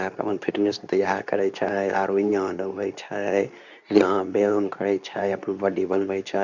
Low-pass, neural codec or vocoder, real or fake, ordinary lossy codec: 7.2 kHz; codec, 24 kHz, 0.9 kbps, WavTokenizer, medium speech release version 2; fake; none